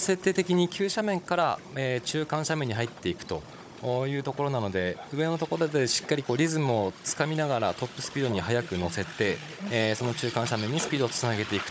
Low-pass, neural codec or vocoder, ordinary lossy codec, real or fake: none; codec, 16 kHz, 16 kbps, FunCodec, trained on Chinese and English, 50 frames a second; none; fake